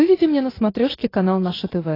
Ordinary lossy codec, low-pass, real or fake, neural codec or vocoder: AAC, 24 kbps; 5.4 kHz; real; none